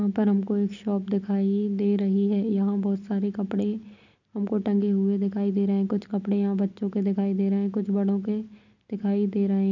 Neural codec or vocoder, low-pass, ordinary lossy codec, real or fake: none; 7.2 kHz; none; real